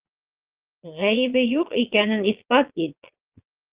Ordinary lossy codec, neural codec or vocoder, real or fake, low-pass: Opus, 32 kbps; vocoder, 22.05 kHz, 80 mel bands, Vocos; fake; 3.6 kHz